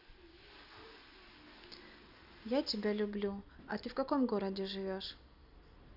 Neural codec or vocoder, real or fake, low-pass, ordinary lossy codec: none; real; 5.4 kHz; none